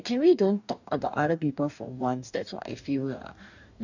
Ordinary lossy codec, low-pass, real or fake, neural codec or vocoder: none; 7.2 kHz; fake; codec, 44.1 kHz, 2.6 kbps, DAC